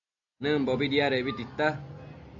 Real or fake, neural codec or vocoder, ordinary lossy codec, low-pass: real; none; AAC, 64 kbps; 7.2 kHz